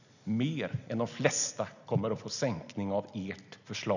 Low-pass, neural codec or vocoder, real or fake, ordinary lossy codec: 7.2 kHz; none; real; none